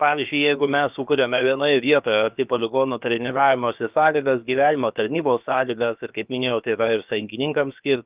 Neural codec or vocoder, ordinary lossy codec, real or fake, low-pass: codec, 16 kHz, about 1 kbps, DyCAST, with the encoder's durations; Opus, 24 kbps; fake; 3.6 kHz